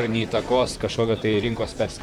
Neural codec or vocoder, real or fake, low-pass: vocoder, 44.1 kHz, 128 mel bands, Pupu-Vocoder; fake; 19.8 kHz